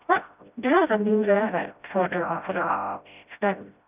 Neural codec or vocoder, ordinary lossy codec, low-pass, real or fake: codec, 16 kHz, 0.5 kbps, FreqCodec, smaller model; none; 3.6 kHz; fake